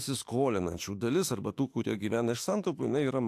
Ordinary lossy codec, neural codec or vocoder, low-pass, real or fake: AAC, 64 kbps; autoencoder, 48 kHz, 32 numbers a frame, DAC-VAE, trained on Japanese speech; 14.4 kHz; fake